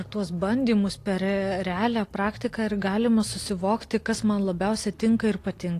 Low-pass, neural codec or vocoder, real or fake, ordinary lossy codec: 14.4 kHz; none; real; AAC, 48 kbps